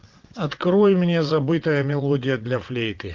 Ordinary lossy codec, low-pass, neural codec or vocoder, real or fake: Opus, 32 kbps; 7.2 kHz; vocoder, 22.05 kHz, 80 mel bands, WaveNeXt; fake